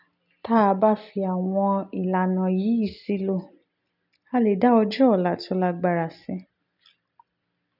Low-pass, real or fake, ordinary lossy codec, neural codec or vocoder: 5.4 kHz; real; none; none